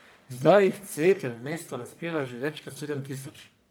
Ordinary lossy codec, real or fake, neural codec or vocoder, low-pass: none; fake; codec, 44.1 kHz, 1.7 kbps, Pupu-Codec; none